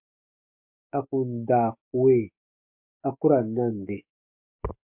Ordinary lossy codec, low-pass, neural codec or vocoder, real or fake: MP3, 32 kbps; 3.6 kHz; codec, 44.1 kHz, 7.8 kbps, DAC; fake